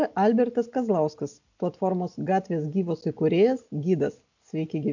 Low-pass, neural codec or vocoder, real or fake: 7.2 kHz; none; real